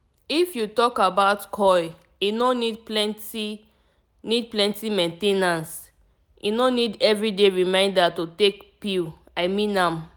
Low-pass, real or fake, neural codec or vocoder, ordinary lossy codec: none; real; none; none